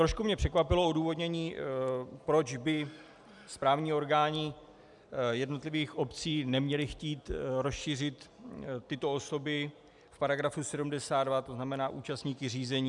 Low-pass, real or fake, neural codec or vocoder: 10.8 kHz; real; none